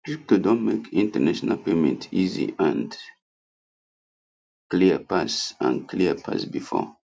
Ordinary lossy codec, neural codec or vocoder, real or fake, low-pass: none; none; real; none